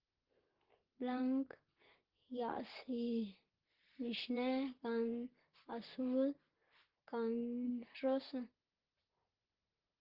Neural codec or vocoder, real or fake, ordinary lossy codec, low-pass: vocoder, 44.1 kHz, 128 mel bands, Pupu-Vocoder; fake; Opus, 16 kbps; 5.4 kHz